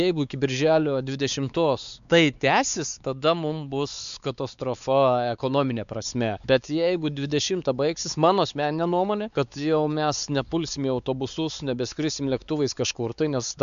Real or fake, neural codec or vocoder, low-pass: fake; codec, 16 kHz, 4 kbps, X-Codec, WavLM features, trained on Multilingual LibriSpeech; 7.2 kHz